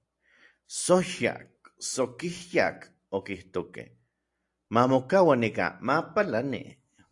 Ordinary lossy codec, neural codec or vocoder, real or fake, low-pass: AAC, 64 kbps; none; real; 10.8 kHz